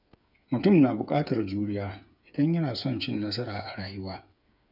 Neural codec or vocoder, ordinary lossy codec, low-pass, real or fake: codec, 16 kHz, 8 kbps, FreqCodec, smaller model; none; 5.4 kHz; fake